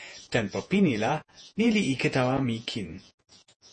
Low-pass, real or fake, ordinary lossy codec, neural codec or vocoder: 10.8 kHz; fake; MP3, 32 kbps; vocoder, 48 kHz, 128 mel bands, Vocos